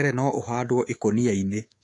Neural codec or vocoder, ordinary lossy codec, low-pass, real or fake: codec, 24 kHz, 3.1 kbps, DualCodec; AAC, 48 kbps; 10.8 kHz; fake